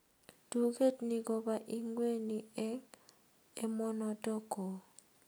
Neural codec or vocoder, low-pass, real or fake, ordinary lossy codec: none; none; real; none